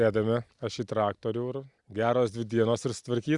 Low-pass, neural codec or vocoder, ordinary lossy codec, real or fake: 10.8 kHz; none; Opus, 64 kbps; real